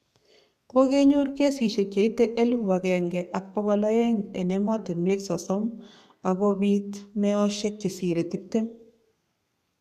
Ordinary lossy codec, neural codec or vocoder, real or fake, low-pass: none; codec, 32 kHz, 1.9 kbps, SNAC; fake; 14.4 kHz